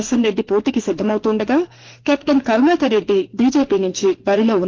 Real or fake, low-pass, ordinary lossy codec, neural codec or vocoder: fake; 7.2 kHz; Opus, 16 kbps; autoencoder, 48 kHz, 32 numbers a frame, DAC-VAE, trained on Japanese speech